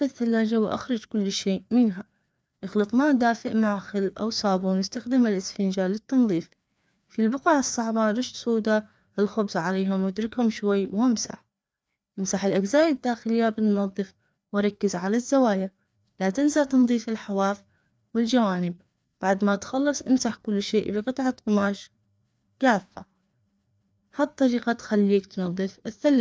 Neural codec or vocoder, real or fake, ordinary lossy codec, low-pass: codec, 16 kHz, 2 kbps, FreqCodec, larger model; fake; none; none